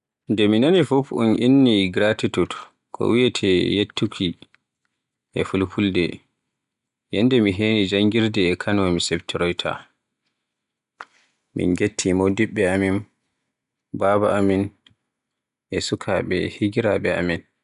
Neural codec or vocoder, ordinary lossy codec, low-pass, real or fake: none; none; 10.8 kHz; real